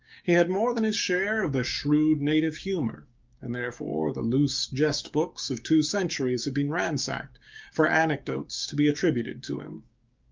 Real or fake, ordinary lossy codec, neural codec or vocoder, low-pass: fake; Opus, 24 kbps; codec, 16 kHz, 6 kbps, DAC; 7.2 kHz